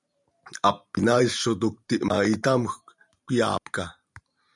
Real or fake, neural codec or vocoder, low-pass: fake; vocoder, 44.1 kHz, 128 mel bands every 512 samples, BigVGAN v2; 10.8 kHz